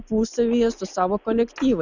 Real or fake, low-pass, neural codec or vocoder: real; 7.2 kHz; none